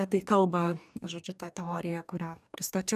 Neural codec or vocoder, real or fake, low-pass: codec, 44.1 kHz, 2.6 kbps, DAC; fake; 14.4 kHz